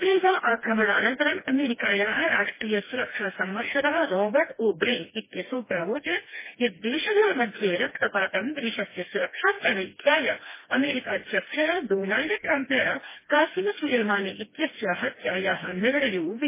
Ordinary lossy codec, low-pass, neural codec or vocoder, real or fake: MP3, 16 kbps; 3.6 kHz; codec, 16 kHz, 1 kbps, FreqCodec, smaller model; fake